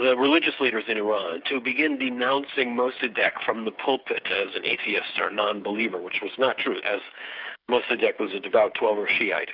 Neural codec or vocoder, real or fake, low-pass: codec, 16 kHz, 8 kbps, FreqCodec, smaller model; fake; 5.4 kHz